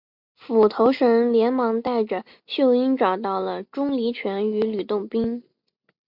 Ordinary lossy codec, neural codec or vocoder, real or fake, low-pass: MP3, 48 kbps; none; real; 5.4 kHz